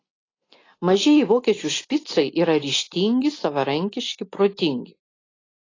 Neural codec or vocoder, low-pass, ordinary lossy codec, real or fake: none; 7.2 kHz; AAC, 32 kbps; real